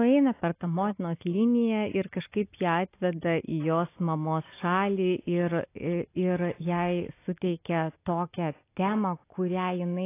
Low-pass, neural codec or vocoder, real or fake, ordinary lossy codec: 3.6 kHz; none; real; AAC, 24 kbps